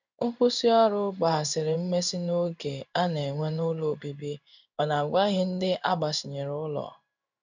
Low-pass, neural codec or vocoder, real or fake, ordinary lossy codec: 7.2 kHz; codec, 16 kHz in and 24 kHz out, 1 kbps, XY-Tokenizer; fake; none